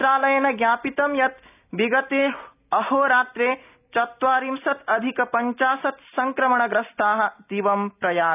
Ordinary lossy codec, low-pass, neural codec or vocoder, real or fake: none; 3.6 kHz; none; real